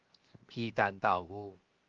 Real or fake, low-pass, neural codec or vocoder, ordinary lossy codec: fake; 7.2 kHz; codec, 16 kHz, 0.7 kbps, FocalCodec; Opus, 32 kbps